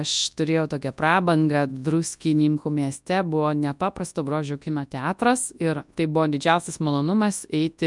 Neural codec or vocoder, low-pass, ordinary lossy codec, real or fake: codec, 24 kHz, 0.9 kbps, WavTokenizer, large speech release; 10.8 kHz; MP3, 96 kbps; fake